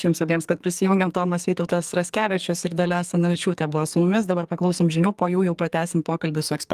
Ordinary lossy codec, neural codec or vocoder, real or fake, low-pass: Opus, 32 kbps; codec, 44.1 kHz, 2.6 kbps, SNAC; fake; 14.4 kHz